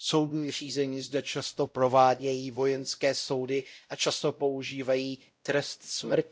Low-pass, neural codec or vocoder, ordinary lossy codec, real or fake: none; codec, 16 kHz, 0.5 kbps, X-Codec, WavLM features, trained on Multilingual LibriSpeech; none; fake